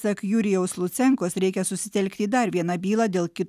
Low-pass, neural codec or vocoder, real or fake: 14.4 kHz; none; real